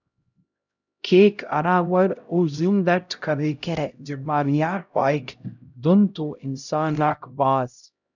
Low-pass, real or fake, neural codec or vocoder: 7.2 kHz; fake; codec, 16 kHz, 0.5 kbps, X-Codec, HuBERT features, trained on LibriSpeech